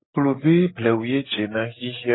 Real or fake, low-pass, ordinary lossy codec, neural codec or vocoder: real; 7.2 kHz; AAC, 16 kbps; none